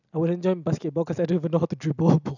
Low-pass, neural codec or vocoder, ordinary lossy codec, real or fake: 7.2 kHz; none; none; real